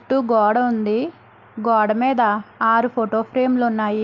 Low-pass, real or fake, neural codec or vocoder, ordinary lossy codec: 7.2 kHz; real; none; Opus, 24 kbps